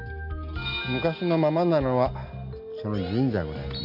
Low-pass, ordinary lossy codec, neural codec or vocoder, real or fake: 5.4 kHz; none; none; real